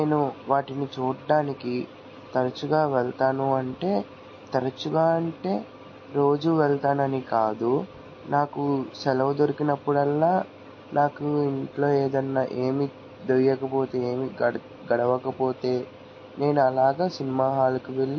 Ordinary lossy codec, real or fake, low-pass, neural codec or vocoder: MP3, 32 kbps; real; 7.2 kHz; none